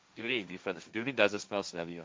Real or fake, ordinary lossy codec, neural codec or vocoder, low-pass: fake; none; codec, 16 kHz, 1.1 kbps, Voila-Tokenizer; none